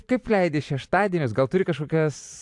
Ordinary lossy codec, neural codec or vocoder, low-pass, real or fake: AAC, 64 kbps; vocoder, 44.1 kHz, 128 mel bands every 512 samples, BigVGAN v2; 10.8 kHz; fake